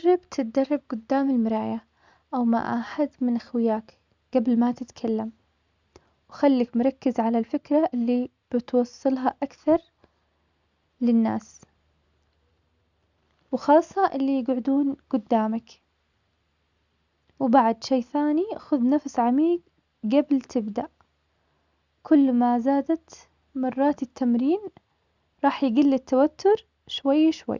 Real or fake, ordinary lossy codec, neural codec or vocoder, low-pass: real; Opus, 64 kbps; none; 7.2 kHz